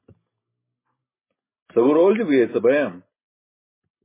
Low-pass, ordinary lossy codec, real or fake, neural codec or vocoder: 3.6 kHz; MP3, 16 kbps; real; none